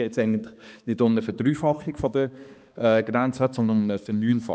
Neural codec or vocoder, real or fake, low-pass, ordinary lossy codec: codec, 16 kHz, 2 kbps, X-Codec, HuBERT features, trained on balanced general audio; fake; none; none